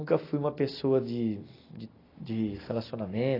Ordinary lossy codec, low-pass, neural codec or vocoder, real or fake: AAC, 24 kbps; 5.4 kHz; none; real